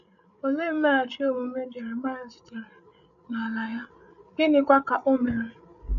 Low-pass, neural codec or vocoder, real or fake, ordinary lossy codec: 7.2 kHz; codec, 16 kHz, 8 kbps, FreqCodec, larger model; fake; none